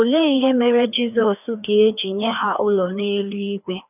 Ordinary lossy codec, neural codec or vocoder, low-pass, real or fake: none; codec, 16 kHz, 2 kbps, FreqCodec, larger model; 3.6 kHz; fake